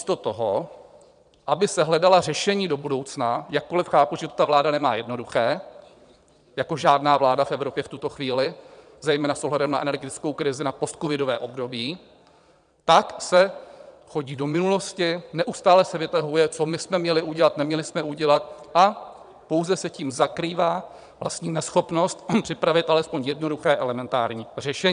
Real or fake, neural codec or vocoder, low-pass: fake; vocoder, 22.05 kHz, 80 mel bands, Vocos; 9.9 kHz